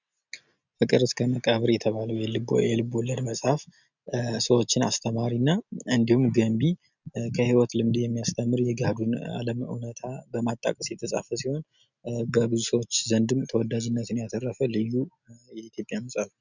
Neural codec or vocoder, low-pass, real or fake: none; 7.2 kHz; real